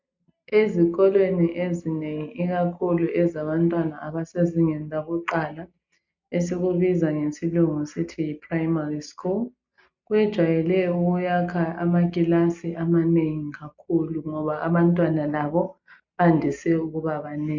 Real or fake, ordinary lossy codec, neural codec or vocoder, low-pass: real; MP3, 64 kbps; none; 7.2 kHz